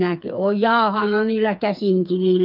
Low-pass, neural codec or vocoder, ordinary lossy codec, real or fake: 5.4 kHz; codec, 16 kHz, 4 kbps, FreqCodec, smaller model; none; fake